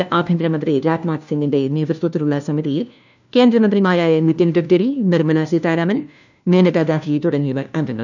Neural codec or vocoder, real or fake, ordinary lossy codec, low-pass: codec, 16 kHz, 1 kbps, FunCodec, trained on LibriTTS, 50 frames a second; fake; none; 7.2 kHz